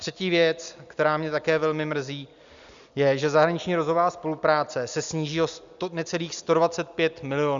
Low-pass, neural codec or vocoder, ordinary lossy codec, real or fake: 7.2 kHz; none; Opus, 64 kbps; real